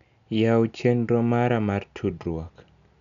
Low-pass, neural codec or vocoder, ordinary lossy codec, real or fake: 7.2 kHz; none; none; real